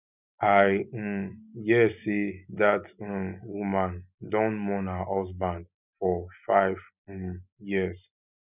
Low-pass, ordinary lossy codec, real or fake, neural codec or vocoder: 3.6 kHz; none; real; none